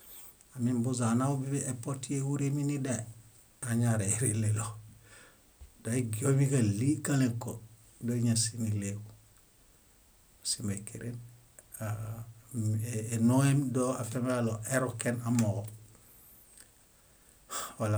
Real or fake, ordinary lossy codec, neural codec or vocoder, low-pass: real; none; none; none